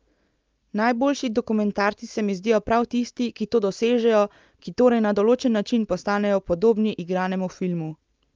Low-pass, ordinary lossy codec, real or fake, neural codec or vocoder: 7.2 kHz; Opus, 24 kbps; real; none